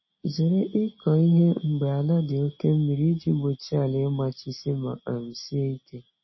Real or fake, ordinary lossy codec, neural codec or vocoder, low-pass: real; MP3, 24 kbps; none; 7.2 kHz